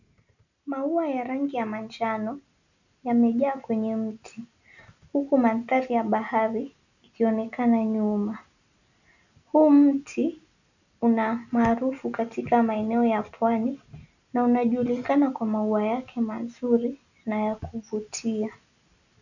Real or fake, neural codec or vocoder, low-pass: real; none; 7.2 kHz